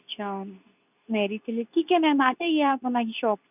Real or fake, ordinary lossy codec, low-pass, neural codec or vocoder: fake; none; 3.6 kHz; codec, 24 kHz, 0.9 kbps, WavTokenizer, medium speech release version 2